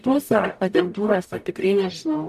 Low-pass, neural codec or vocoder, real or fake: 14.4 kHz; codec, 44.1 kHz, 0.9 kbps, DAC; fake